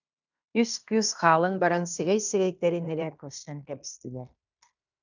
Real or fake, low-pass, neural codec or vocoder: fake; 7.2 kHz; codec, 16 kHz in and 24 kHz out, 0.9 kbps, LongCat-Audio-Codec, fine tuned four codebook decoder